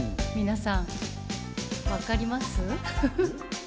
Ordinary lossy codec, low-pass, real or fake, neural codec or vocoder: none; none; real; none